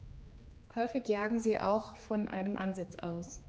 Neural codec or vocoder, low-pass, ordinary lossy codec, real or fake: codec, 16 kHz, 2 kbps, X-Codec, HuBERT features, trained on general audio; none; none; fake